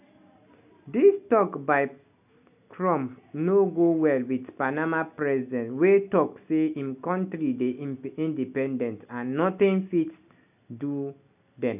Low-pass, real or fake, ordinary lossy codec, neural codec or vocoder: 3.6 kHz; real; none; none